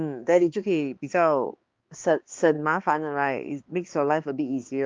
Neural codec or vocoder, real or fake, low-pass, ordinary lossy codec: codec, 16 kHz, 2 kbps, X-Codec, HuBERT features, trained on balanced general audio; fake; 7.2 kHz; Opus, 32 kbps